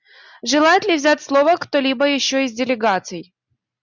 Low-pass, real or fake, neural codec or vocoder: 7.2 kHz; real; none